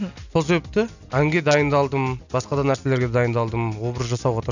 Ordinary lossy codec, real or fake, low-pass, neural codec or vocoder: none; real; 7.2 kHz; none